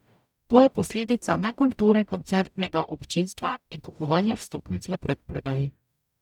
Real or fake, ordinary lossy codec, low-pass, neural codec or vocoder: fake; none; 19.8 kHz; codec, 44.1 kHz, 0.9 kbps, DAC